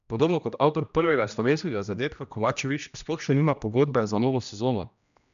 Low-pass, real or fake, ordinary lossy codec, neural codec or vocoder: 7.2 kHz; fake; none; codec, 16 kHz, 1 kbps, X-Codec, HuBERT features, trained on general audio